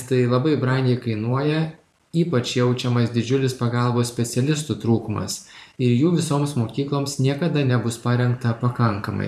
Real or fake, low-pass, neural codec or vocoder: fake; 14.4 kHz; vocoder, 44.1 kHz, 128 mel bands every 512 samples, BigVGAN v2